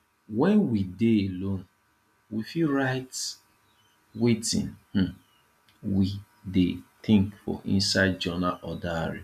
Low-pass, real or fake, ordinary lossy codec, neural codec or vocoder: 14.4 kHz; real; none; none